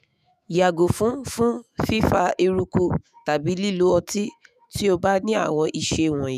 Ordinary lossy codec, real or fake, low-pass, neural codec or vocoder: none; fake; 14.4 kHz; autoencoder, 48 kHz, 128 numbers a frame, DAC-VAE, trained on Japanese speech